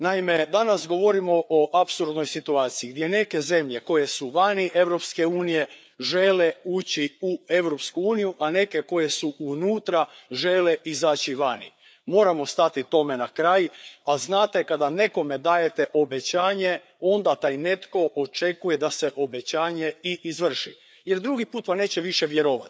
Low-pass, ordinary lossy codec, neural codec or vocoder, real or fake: none; none; codec, 16 kHz, 4 kbps, FreqCodec, larger model; fake